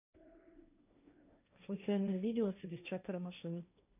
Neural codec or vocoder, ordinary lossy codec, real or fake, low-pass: codec, 16 kHz, 1.1 kbps, Voila-Tokenizer; none; fake; 3.6 kHz